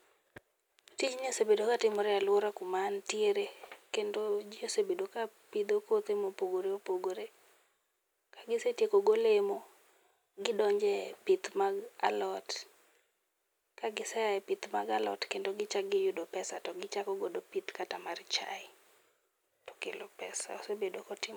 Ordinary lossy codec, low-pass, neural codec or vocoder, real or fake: none; none; none; real